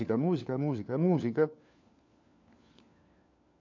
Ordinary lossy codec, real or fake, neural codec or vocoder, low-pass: MP3, 48 kbps; fake; codec, 16 kHz, 4 kbps, FunCodec, trained on LibriTTS, 50 frames a second; 7.2 kHz